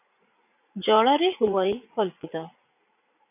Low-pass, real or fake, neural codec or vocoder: 3.6 kHz; fake; vocoder, 44.1 kHz, 80 mel bands, Vocos